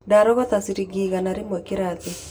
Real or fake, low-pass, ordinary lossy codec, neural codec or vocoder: real; none; none; none